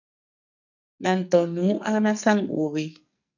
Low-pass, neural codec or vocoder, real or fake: 7.2 kHz; codec, 44.1 kHz, 2.6 kbps, SNAC; fake